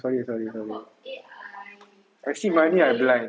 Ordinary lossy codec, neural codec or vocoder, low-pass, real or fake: none; none; none; real